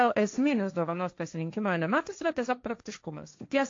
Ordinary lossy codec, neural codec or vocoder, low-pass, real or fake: AAC, 48 kbps; codec, 16 kHz, 1.1 kbps, Voila-Tokenizer; 7.2 kHz; fake